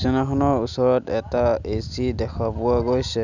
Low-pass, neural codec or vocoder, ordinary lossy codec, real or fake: 7.2 kHz; none; none; real